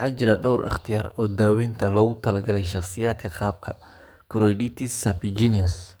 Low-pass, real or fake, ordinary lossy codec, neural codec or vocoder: none; fake; none; codec, 44.1 kHz, 2.6 kbps, SNAC